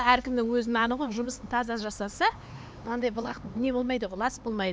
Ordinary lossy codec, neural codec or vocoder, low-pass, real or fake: none; codec, 16 kHz, 4 kbps, X-Codec, HuBERT features, trained on LibriSpeech; none; fake